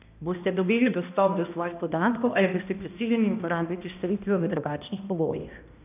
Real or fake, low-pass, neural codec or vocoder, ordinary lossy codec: fake; 3.6 kHz; codec, 16 kHz, 1 kbps, X-Codec, HuBERT features, trained on balanced general audio; none